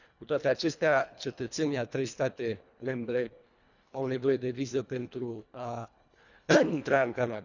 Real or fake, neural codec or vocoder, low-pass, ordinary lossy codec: fake; codec, 24 kHz, 1.5 kbps, HILCodec; 7.2 kHz; none